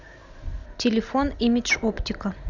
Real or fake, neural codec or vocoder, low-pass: real; none; 7.2 kHz